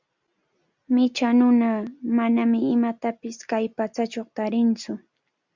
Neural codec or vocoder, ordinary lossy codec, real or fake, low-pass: none; Opus, 64 kbps; real; 7.2 kHz